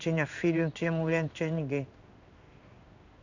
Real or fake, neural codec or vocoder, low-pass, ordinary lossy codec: fake; codec, 16 kHz in and 24 kHz out, 1 kbps, XY-Tokenizer; 7.2 kHz; none